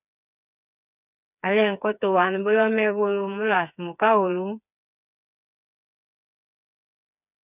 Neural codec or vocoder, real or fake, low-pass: codec, 16 kHz, 4 kbps, FreqCodec, smaller model; fake; 3.6 kHz